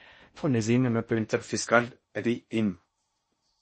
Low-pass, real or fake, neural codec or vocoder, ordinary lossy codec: 10.8 kHz; fake; codec, 16 kHz in and 24 kHz out, 0.6 kbps, FocalCodec, streaming, 4096 codes; MP3, 32 kbps